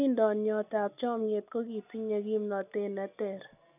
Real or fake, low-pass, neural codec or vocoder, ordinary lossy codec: real; 3.6 kHz; none; none